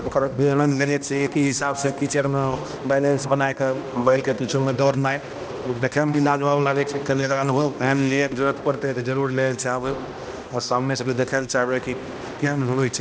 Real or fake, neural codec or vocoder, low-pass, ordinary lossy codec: fake; codec, 16 kHz, 1 kbps, X-Codec, HuBERT features, trained on balanced general audio; none; none